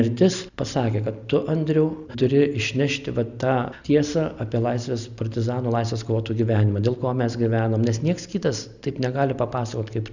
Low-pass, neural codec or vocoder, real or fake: 7.2 kHz; none; real